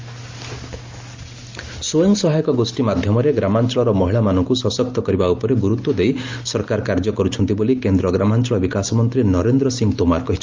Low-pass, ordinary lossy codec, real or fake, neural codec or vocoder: 7.2 kHz; Opus, 32 kbps; real; none